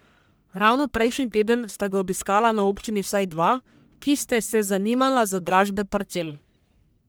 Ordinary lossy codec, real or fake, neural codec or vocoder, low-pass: none; fake; codec, 44.1 kHz, 1.7 kbps, Pupu-Codec; none